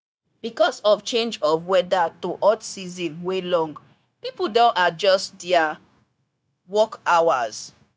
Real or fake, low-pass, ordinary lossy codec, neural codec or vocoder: fake; none; none; codec, 16 kHz, 0.9 kbps, LongCat-Audio-Codec